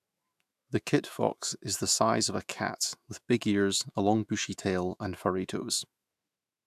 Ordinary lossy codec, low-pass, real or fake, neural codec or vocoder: AAC, 96 kbps; 14.4 kHz; fake; autoencoder, 48 kHz, 128 numbers a frame, DAC-VAE, trained on Japanese speech